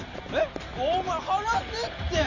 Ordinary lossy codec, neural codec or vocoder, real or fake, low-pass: none; none; real; 7.2 kHz